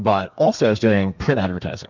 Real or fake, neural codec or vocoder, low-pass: fake; codec, 32 kHz, 1.9 kbps, SNAC; 7.2 kHz